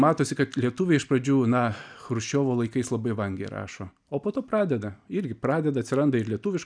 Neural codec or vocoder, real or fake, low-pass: none; real; 9.9 kHz